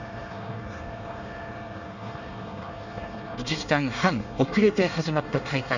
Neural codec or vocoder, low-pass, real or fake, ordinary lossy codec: codec, 24 kHz, 1 kbps, SNAC; 7.2 kHz; fake; none